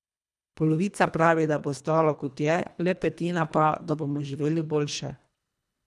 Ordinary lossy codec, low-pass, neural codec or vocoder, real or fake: none; none; codec, 24 kHz, 1.5 kbps, HILCodec; fake